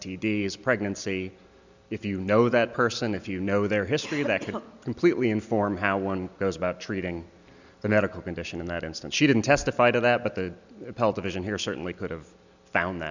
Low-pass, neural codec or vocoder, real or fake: 7.2 kHz; none; real